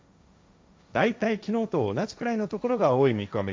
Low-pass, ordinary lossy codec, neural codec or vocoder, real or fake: none; none; codec, 16 kHz, 1.1 kbps, Voila-Tokenizer; fake